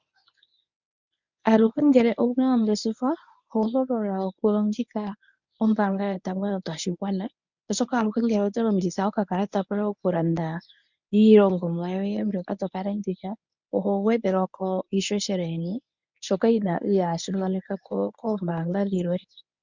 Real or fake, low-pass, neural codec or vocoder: fake; 7.2 kHz; codec, 24 kHz, 0.9 kbps, WavTokenizer, medium speech release version 1